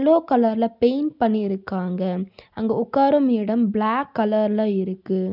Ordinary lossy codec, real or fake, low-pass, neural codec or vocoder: none; real; 5.4 kHz; none